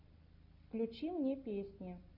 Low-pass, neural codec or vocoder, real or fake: 5.4 kHz; none; real